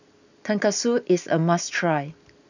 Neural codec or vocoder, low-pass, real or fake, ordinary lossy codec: none; 7.2 kHz; real; none